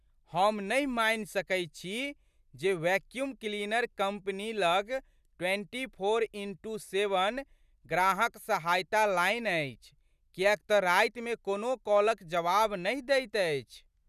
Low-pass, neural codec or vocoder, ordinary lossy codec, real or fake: 14.4 kHz; none; none; real